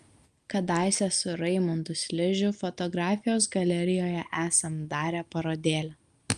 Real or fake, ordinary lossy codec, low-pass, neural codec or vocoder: real; Opus, 32 kbps; 10.8 kHz; none